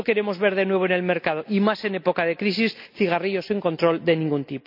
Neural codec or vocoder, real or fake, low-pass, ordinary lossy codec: none; real; 5.4 kHz; MP3, 48 kbps